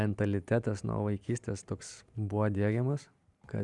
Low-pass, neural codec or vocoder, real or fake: 10.8 kHz; vocoder, 44.1 kHz, 128 mel bands every 512 samples, BigVGAN v2; fake